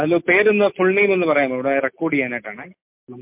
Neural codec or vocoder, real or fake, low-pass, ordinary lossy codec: none; real; 3.6 kHz; MP3, 32 kbps